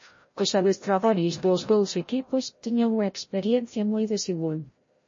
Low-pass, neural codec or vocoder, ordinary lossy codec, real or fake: 7.2 kHz; codec, 16 kHz, 0.5 kbps, FreqCodec, larger model; MP3, 32 kbps; fake